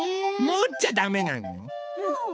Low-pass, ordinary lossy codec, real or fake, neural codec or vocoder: none; none; fake; codec, 16 kHz, 4 kbps, X-Codec, HuBERT features, trained on balanced general audio